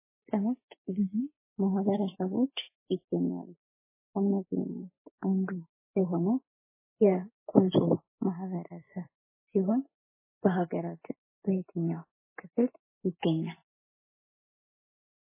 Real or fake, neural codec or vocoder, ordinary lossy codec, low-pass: real; none; MP3, 16 kbps; 3.6 kHz